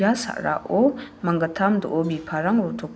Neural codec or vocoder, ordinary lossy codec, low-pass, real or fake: none; none; none; real